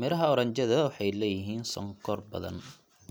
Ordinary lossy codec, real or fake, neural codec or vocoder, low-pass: none; real; none; none